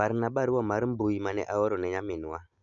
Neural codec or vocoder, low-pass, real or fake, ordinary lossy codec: none; 7.2 kHz; real; none